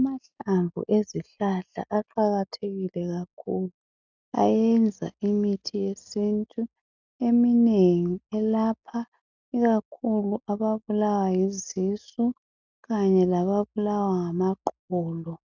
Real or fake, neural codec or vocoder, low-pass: real; none; 7.2 kHz